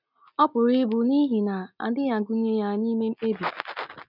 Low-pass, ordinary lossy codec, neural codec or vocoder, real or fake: 5.4 kHz; none; none; real